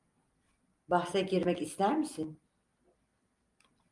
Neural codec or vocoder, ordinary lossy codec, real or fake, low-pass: none; Opus, 32 kbps; real; 10.8 kHz